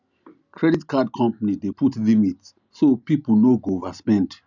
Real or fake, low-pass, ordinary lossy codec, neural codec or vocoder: real; 7.2 kHz; none; none